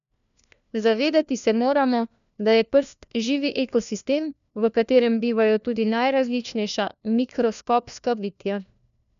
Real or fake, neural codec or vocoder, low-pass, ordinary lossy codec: fake; codec, 16 kHz, 1 kbps, FunCodec, trained on LibriTTS, 50 frames a second; 7.2 kHz; none